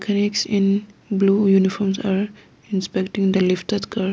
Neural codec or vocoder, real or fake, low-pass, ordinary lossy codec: none; real; none; none